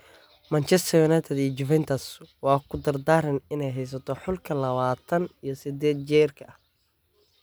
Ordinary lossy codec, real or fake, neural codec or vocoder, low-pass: none; real; none; none